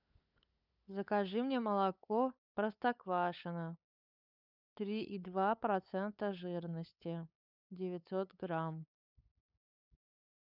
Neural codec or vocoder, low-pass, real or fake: codec, 16 kHz, 8 kbps, FunCodec, trained on LibriTTS, 25 frames a second; 5.4 kHz; fake